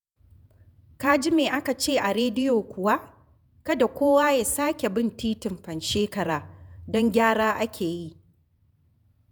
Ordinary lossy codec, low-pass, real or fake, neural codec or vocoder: none; none; fake; vocoder, 48 kHz, 128 mel bands, Vocos